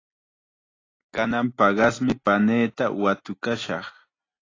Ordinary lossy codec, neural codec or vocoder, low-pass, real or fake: AAC, 32 kbps; none; 7.2 kHz; real